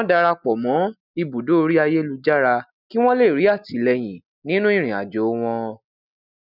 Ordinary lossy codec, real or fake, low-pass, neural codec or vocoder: none; real; 5.4 kHz; none